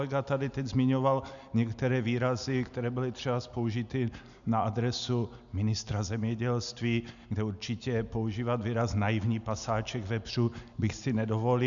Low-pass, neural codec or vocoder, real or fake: 7.2 kHz; none; real